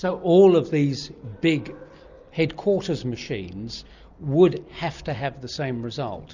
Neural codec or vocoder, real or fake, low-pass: none; real; 7.2 kHz